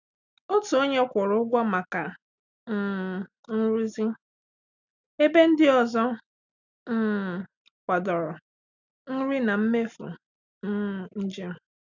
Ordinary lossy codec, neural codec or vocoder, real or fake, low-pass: none; none; real; 7.2 kHz